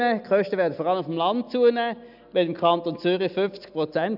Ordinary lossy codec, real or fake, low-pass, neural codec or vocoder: none; real; 5.4 kHz; none